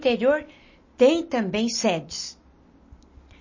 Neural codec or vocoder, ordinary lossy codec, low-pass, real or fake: none; MP3, 32 kbps; 7.2 kHz; real